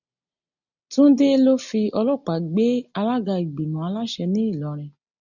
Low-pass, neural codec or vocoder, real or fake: 7.2 kHz; none; real